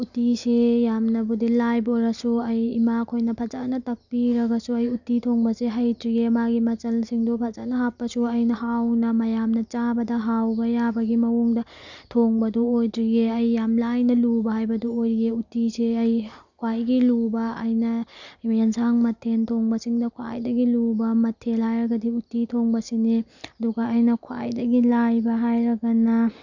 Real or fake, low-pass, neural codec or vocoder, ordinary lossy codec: real; 7.2 kHz; none; none